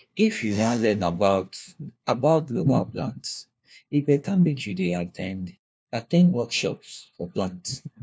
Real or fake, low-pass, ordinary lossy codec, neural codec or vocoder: fake; none; none; codec, 16 kHz, 1 kbps, FunCodec, trained on LibriTTS, 50 frames a second